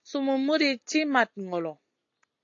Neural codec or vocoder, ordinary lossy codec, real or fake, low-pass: none; AAC, 48 kbps; real; 7.2 kHz